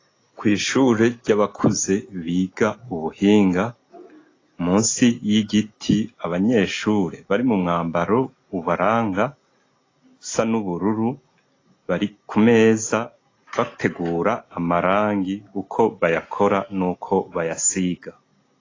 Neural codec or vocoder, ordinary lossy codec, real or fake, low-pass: none; AAC, 32 kbps; real; 7.2 kHz